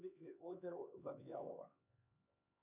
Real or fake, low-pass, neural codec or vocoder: fake; 3.6 kHz; codec, 16 kHz, 4 kbps, X-Codec, HuBERT features, trained on LibriSpeech